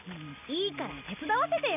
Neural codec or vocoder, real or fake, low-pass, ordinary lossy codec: none; real; 3.6 kHz; none